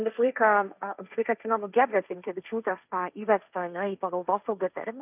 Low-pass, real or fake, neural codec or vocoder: 3.6 kHz; fake; codec, 16 kHz, 1.1 kbps, Voila-Tokenizer